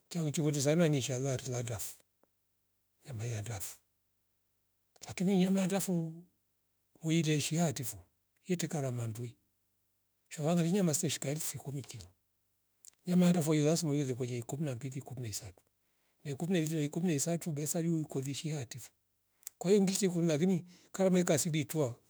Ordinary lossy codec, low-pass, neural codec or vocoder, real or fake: none; none; autoencoder, 48 kHz, 32 numbers a frame, DAC-VAE, trained on Japanese speech; fake